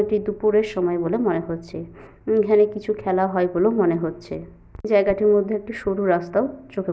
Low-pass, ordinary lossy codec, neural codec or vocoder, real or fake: none; none; none; real